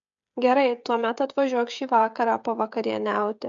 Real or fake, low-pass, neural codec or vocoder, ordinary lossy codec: fake; 7.2 kHz; codec, 16 kHz, 16 kbps, FreqCodec, smaller model; MP3, 64 kbps